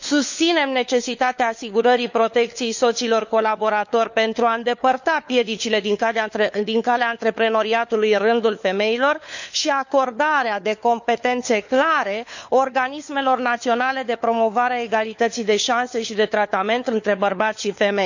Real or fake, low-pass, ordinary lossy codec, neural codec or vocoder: fake; 7.2 kHz; none; codec, 16 kHz, 4 kbps, FunCodec, trained on LibriTTS, 50 frames a second